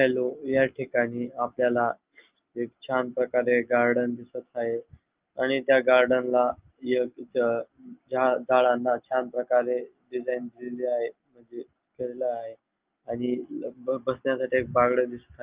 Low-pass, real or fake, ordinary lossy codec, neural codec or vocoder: 3.6 kHz; real; Opus, 32 kbps; none